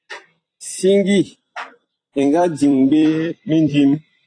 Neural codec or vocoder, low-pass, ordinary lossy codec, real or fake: vocoder, 44.1 kHz, 128 mel bands every 256 samples, BigVGAN v2; 9.9 kHz; AAC, 48 kbps; fake